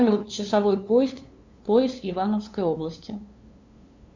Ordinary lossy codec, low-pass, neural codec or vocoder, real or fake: Opus, 64 kbps; 7.2 kHz; codec, 16 kHz, 2 kbps, FunCodec, trained on LibriTTS, 25 frames a second; fake